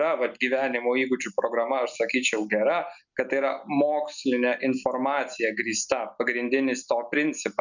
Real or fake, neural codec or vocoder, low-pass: real; none; 7.2 kHz